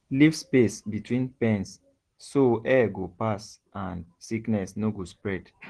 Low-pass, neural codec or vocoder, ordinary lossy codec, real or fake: 9.9 kHz; none; Opus, 16 kbps; real